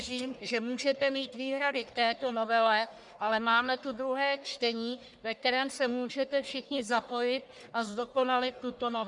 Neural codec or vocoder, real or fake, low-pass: codec, 44.1 kHz, 1.7 kbps, Pupu-Codec; fake; 10.8 kHz